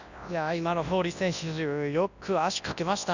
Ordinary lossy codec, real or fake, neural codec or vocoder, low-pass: none; fake; codec, 24 kHz, 0.9 kbps, WavTokenizer, large speech release; 7.2 kHz